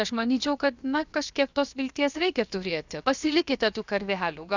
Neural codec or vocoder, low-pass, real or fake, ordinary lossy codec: codec, 16 kHz, about 1 kbps, DyCAST, with the encoder's durations; 7.2 kHz; fake; Opus, 64 kbps